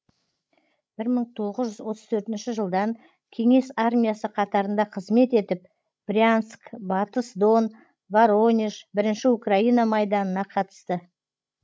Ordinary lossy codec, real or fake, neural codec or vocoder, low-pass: none; fake; codec, 16 kHz, 16 kbps, FreqCodec, larger model; none